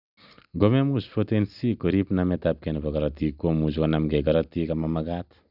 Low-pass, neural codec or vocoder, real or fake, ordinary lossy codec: 5.4 kHz; none; real; none